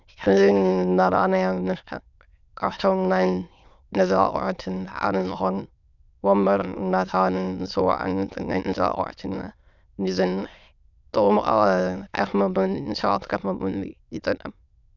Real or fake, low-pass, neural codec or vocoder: fake; 7.2 kHz; autoencoder, 22.05 kHz, a latent of 192 numbers a frame, VITS, trained on many speakers